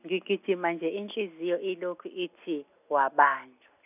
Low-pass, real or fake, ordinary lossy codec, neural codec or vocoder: 3.6 kHz; real; none; none